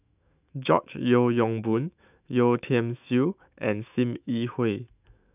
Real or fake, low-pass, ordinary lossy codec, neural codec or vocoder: fake; 3.6 kHz; none; autoencoder, 48 kHz, 128 numbers a frame, DAC-VAE, trained on Japanese speech